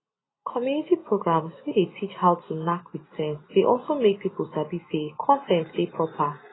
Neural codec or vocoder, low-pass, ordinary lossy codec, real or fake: none; 7.2 kHz; AAC, 16 kbps; real